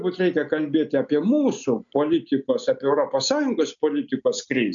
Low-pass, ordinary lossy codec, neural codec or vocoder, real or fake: 7.2 kHz; MP3, 96 kbps; none; real